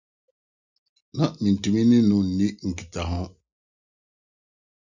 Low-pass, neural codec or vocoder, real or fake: 7.2 kHz; none; real